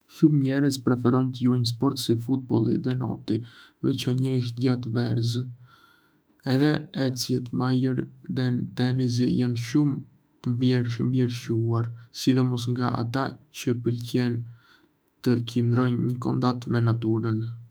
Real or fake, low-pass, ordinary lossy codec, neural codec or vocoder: fake; none; none; autoencoder, 48 kHz, 32 numbers a frame, DAC-VAE, trained on Japanese speech